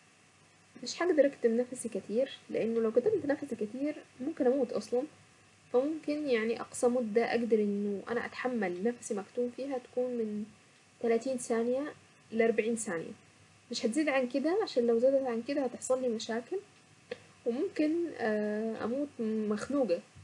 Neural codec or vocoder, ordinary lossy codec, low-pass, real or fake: none; none; 10.8 kHz; real